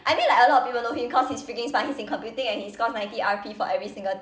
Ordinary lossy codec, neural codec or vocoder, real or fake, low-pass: none; none; real; none